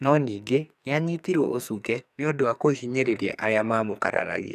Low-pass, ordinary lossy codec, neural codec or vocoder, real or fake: 14.4 kHz; none; codec, 44.1 kHz, 2.6 kbps, SNAC; fake